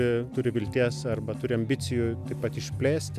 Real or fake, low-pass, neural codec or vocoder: real; 14.4 kHz; none